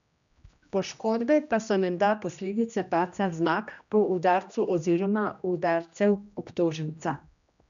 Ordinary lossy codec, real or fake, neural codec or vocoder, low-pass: none; fake; codec, 16 kHz, 1 kbps, X-Codec, HuBERT features, trained on general audio; 7.2 kHz